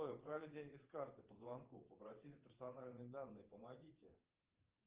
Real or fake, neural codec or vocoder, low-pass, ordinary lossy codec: fake; vocoder, 22.05 kHz, 80 mel bands, WaveNeXt; 3.6 kHz; Opus, 32 kbps